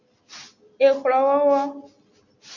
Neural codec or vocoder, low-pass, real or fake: none; 7.2 kHz; real